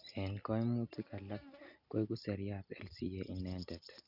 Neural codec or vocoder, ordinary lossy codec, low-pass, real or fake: none; none; 5.4 kHz; real